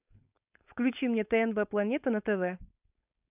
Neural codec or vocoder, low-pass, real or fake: codec, 16 kHz, 4.8 kbps, FACodec; 3.6 kHz; fake